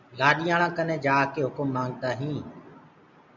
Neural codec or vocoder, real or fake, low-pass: none; real; 7.2 kHz